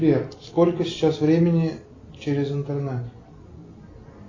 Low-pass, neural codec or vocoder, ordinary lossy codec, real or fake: 7.2 kHz; none; AAC, 32 kbps; real